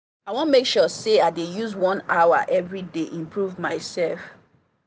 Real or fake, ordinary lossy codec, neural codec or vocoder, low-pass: real; none; none; none